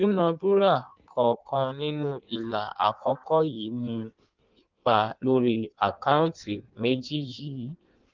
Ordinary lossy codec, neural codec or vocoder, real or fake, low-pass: Opus, 24 kbps; codec, 16 kHz in and 24 kHz out, 1.1 kbps, FireRedTTS-2 codec; fake; 7.2 kHz